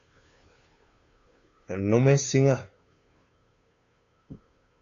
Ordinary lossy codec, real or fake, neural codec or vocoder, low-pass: AAC, 48 kbps; fake; codec, 16 kHz, 2 kbps, FunCodec, trained on Chinese and English, 25 frames a second; 7.2 kHz